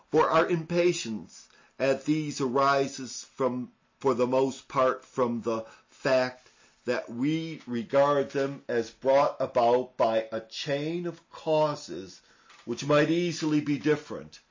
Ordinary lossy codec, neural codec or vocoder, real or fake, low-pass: MP3, 32 kbps; none; real; 7.2 kHz